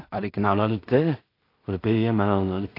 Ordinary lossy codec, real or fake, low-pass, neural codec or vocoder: AAC, 32 kbps; fake; 5.4 kHz; codec, 16 kHz in and 24 kHz out, 0.4 kbps, LongCat-Audio-Codec, two codebook decoder